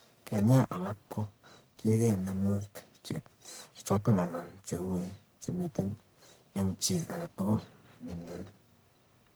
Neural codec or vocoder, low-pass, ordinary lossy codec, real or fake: codec, 44.1 kHz, 1.7 kbps, Pupu-Codec; none; none; fake